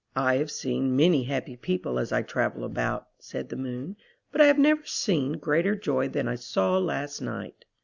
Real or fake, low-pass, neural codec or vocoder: real; 7.2 kHz; none